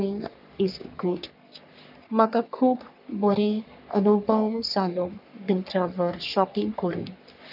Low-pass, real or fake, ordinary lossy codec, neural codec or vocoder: 5.4 kHz; fake; none; codec, 44.1 kHz, 3.4 kbps, Pupu-Codec